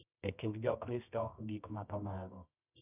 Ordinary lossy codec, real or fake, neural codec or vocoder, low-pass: none; fake; codec, 24 kHz, 0.9 kbps, WavTokenizer, medium music audio release; 3.6 kHz